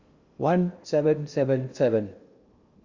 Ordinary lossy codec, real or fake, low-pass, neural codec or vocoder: Opus, 64 kbps; fake; 7.2 kHz; codec, 16 kHz in and 24 kHz out, 0.8 kbps, FocalCodec, streaming, 65536 codes